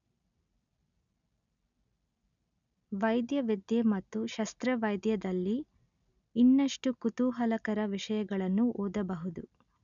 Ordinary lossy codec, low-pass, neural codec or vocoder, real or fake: none; 7.2 kHz; none; real